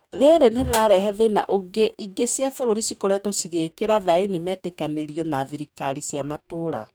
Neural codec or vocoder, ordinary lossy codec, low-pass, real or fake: codec, 44.1 kHz, 2.6 kbps, DAC; none; none; fake